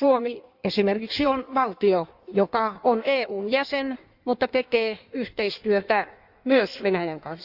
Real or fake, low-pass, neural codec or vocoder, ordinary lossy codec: fake; 5.4 kHz; codec, 16 kHz in and 24 kHz out, 1.1 kbps, FireRedTTS-2 codec; Opus, 64 kbps